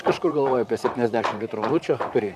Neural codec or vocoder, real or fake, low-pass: vocoder, 44.1 kHz, 128 mel bands, Pupu-Vocoder; fake; 14.4 kHz